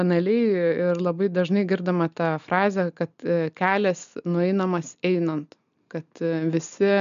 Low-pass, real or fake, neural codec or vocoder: 7.2 kHz; real; none